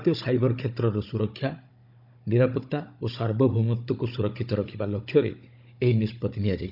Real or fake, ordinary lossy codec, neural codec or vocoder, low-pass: fake; none; codec, 16 kHz, 16 kbps, FunCodec, trained on Chinese and English, 50 frames a second; 5.4 kHz